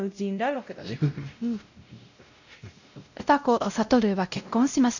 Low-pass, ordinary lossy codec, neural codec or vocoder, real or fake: 7.2 kHz; none; codec, 16 kHz, 0.5 kbps, X-Codec, WavLM features, trained on Multilingual LibriSpeech; fake